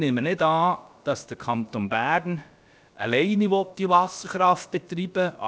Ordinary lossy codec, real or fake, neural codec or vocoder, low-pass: none; fake; codec, 16 kHz, about 1 kbps, DyCAST, with the encoder's durations; none